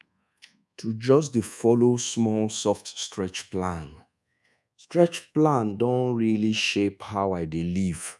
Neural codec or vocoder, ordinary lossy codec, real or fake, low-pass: codec, 24 kHz, 1.2 kbps, DualCodec; none; fake; none